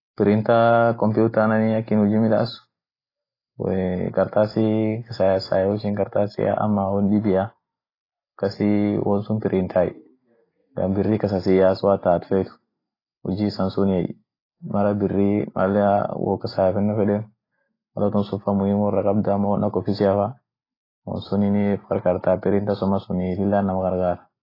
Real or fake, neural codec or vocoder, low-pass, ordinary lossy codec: real; none; 5.4 kHz; AAC, 24 kbps